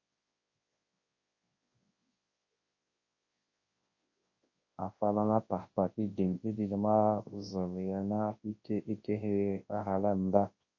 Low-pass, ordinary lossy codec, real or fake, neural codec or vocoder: 7.2 kHz; MP3, 32 kbps; fake; codec, 24 kHz, 0.9 kbps, WavTokenizer, large speech release